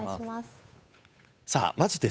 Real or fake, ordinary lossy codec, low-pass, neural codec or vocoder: fake; none; none; codec, 16 kHz, 8 kbps, FunCodec, trained on Chinese and English, 25 frames a second